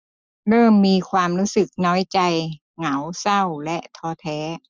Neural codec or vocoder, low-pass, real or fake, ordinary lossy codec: none; none; real; none